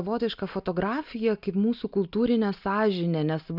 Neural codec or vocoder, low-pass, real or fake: none; 5.4 kHz; real